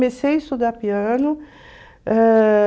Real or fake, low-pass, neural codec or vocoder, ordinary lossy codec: real; none; none; none